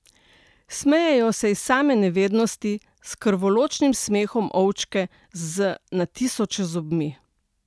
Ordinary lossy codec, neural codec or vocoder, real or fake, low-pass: none; none; real; none